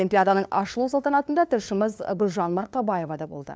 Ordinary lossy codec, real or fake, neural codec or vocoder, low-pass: none; fake; codec, 16 kHz, 2 kbps, FunCodec, trained on LibriTTS, 25 frames a second; none